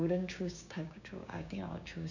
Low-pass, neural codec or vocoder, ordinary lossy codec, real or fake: 7.2 kHz; codec, 16 kHz, 6 kbps, DAC; none; fake